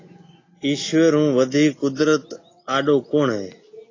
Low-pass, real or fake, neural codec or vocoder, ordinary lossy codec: 7.2 kHz; real; none; AAC, 32 kbps